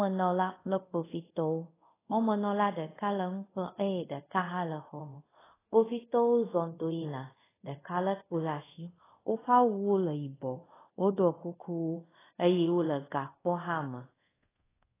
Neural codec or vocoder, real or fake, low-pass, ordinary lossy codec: codec, 24 kHz, 0.5 kbps, DualCodec; fake; 3.6 kHz; AAC, 16 kbps